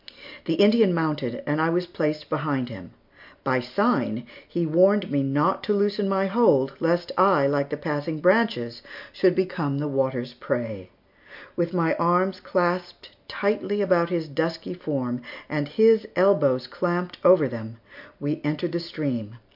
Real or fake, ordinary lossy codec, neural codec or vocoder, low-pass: real; MP3, 48 kbps; none; 5.4 kHz